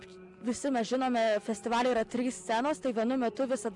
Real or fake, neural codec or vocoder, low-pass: real; none; 10.8 kHz